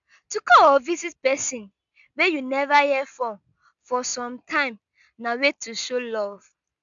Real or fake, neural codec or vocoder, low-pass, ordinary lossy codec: real; none; 7.2 kHz; MP3, 96 kbps